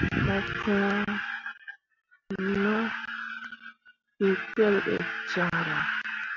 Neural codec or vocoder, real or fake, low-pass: none; real; 7.2 kHz